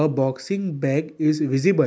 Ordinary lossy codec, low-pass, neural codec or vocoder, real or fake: none; none; none; real